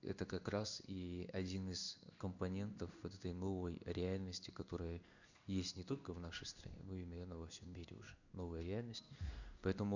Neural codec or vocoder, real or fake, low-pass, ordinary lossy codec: codec, 16 kHz in and 24 kHz out, 1 kbps, XY-Tokenizer; fake; 7.2 kHz; none